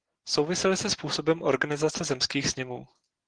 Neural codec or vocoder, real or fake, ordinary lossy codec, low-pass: none; real; Opus, 16 kbps; 9.9 kHz